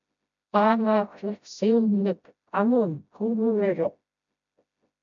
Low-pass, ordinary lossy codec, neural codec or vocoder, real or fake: 7.2 kHz; MP3, 64 kbps; codec, 16 kHz, 0.5 kbps, FreqCodec, smaller model; fake